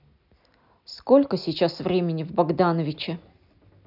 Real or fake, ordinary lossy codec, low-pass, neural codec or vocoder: real; none; 5.4 kHz; none